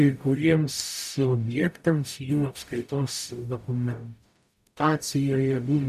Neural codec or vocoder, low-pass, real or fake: codec, 44.1 kHz, 0.9 kbps, DAC; 14.4 kHz; fake